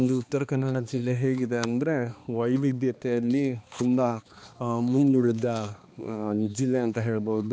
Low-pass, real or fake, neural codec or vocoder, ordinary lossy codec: none; fake; codec, 16 kHz, 2 kbps, X-Codec, HuBERT features, trained on balanced general audio; none